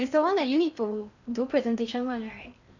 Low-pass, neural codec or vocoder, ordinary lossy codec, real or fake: 7.2 kHz; codec, 16 kHz in and 24 kHz out, 0.8 kbps, FocalCodec, streaming, 65536 codes; none; fake